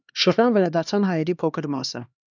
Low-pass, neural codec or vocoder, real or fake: 7.2 kHz; codec, 16 kHz, 2 kbps, X-Codec, HuBERT features, trained on LibriSpeech; fake